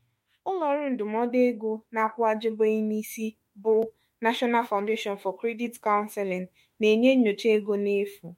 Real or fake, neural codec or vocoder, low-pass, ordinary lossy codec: fake; autoencoder, 48 kHz, 32 numbers a frame, DAC-VAE, trained on Japanese speech; 19.8 kHz; MP3, 64 kbps